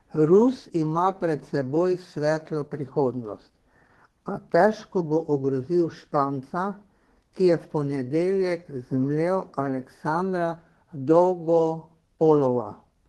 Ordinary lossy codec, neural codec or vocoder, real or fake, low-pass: Opus, 16 kbps; codec, 32 kHz, 1.9 kbps, SNAC; fake; 14.4 kHz